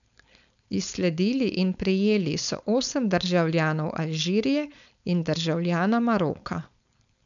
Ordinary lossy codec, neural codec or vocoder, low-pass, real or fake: none; codec, 16 kHz, 4.8 kbps, FACodec; 7.2 kHz; fake